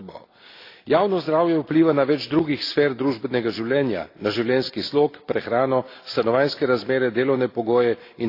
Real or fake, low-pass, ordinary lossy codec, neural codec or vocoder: real; 5.4 kHz; AAC, 32 kbps; none